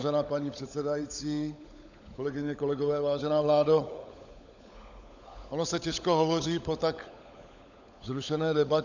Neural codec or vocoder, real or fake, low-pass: codec, 16 kHz, 16 kbps, FunCodec, trained on LibriTTS, 50 frames a second; fake; 7.2 kHz